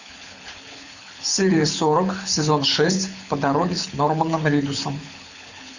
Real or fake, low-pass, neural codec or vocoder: fake; 7.2 kHz; codec, 24 kHz, 6 kbps, HILCodec